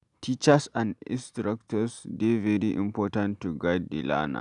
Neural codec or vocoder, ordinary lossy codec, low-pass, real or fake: none; none; 10.8 kHz; real